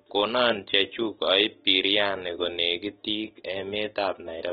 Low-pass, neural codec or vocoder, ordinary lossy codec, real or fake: 19.8 kHz; none; AAC, 16 kbps; real